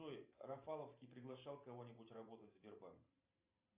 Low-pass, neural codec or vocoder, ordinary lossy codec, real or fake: 3.6 kHz; vocoder, 44.1 kHz, 128 mel bands every 512 samples, BigVGAN v2; Opus, 64 kbps; fake